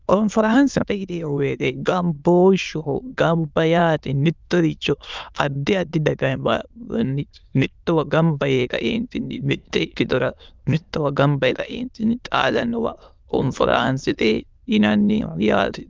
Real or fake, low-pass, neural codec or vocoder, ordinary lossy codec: fake; 7.2 kHz; autoencoder, 22.05 kHz, a latent of 192 numbers a frame, VITS, trained on many speakers; Opus, 24 kbps